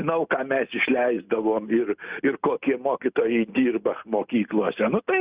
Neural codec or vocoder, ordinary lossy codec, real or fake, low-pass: none; Opus, 64 kbps; real; 3.6 kHz